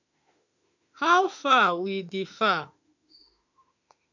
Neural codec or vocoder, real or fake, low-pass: autoencoder, 48 kHz, 32 numbers a frame, DAC-VAE, trained on Japanese speech; fake; 7.2 kHz